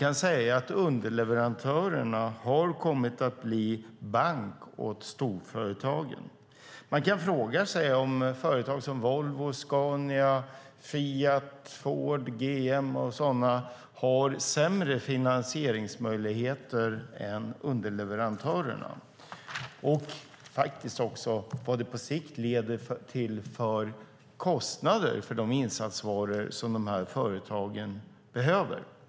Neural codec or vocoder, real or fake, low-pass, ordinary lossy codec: none; real; none; none